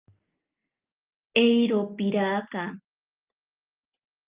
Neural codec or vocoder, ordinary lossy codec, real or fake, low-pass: none; Opus, 32 kbps; real; 3.6 kHz